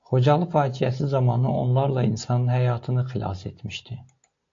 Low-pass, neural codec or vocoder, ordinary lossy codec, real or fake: 7.2 kHz; none; AAC, 48 kbps; real